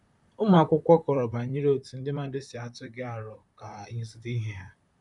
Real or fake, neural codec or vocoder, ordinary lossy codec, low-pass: fake; vocoder, 44.1 kHz, 128 mel bands, Pupu-Vocoder; none; 10.8 kHz